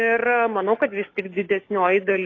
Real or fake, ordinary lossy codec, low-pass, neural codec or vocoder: fake; AAC, 32 kbps; 7.2 kHz; codec, 16 kHz, 6 kbps, DAC